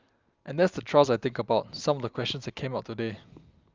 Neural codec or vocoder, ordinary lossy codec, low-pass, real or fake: none; Opus, 24 kbps; 7.2 kHz; real